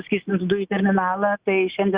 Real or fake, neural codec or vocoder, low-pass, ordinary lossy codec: real; none; 3.6 kHz; Opus, 64 kbps